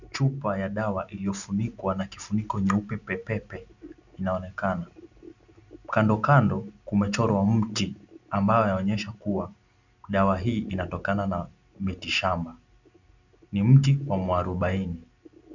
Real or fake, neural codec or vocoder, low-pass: real; none; 7.2 kHz